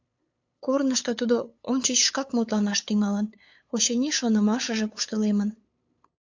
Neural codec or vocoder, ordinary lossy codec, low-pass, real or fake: codec, 16 kHz, 8 kbps, FunCodec, trained on LibriTTS, 25 frames a second; AAC, 48 kbps; 7.2 kHz; fake